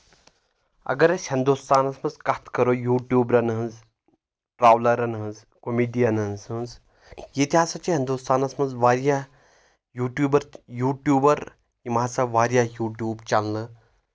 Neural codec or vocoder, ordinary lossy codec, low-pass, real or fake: none; none; none; real